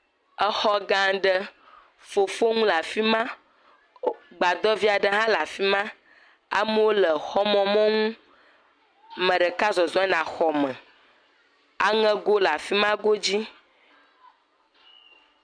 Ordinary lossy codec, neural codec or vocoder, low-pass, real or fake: MP3, 96 kbps; none; 9.9 kHz; real